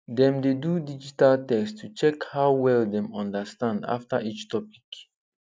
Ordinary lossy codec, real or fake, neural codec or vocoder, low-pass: none; real; none; none